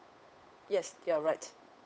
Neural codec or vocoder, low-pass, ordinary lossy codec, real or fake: codec, 16 kHz, 8 kbps, FunCodec, trained on Chinese and English, 25 frames a second; none; none; fake